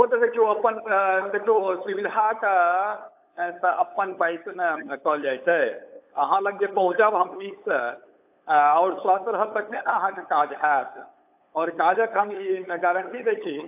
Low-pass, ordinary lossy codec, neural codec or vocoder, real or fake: 3.6 kHz; AAC, 32 kbps; codec, 16 kHz, 8 kbps, FunCodec, trained on LibriTTS, 25 frames a second; fake